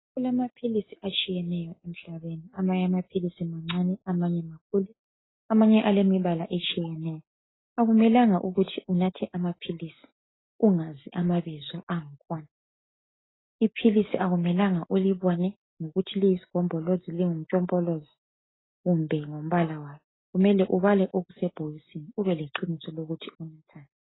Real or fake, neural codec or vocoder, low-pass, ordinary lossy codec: real; none; 7.2 kHz; AAC, 16 kbps